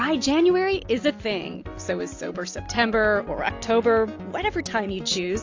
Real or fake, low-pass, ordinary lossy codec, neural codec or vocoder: real; 7.2 kHz; AAC, 48 kbps; none